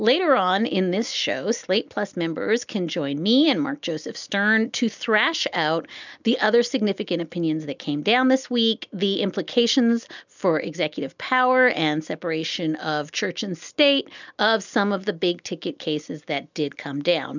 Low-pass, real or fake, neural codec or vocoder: 7.2 kHz; real; none